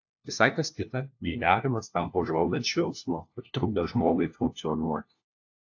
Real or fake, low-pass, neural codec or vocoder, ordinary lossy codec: fake; 7.2 kHz; codec, 16 kHz, 1 kbps, FunCodec, trained on LibriTTS, 50 frames a second; AAC, 48 kbps